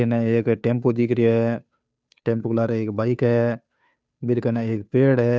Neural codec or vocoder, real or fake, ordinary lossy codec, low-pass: codec, 16 kHz, 2 kbps, FunCodec, trained on Chinese and English, 25 frames a second; fake; none; none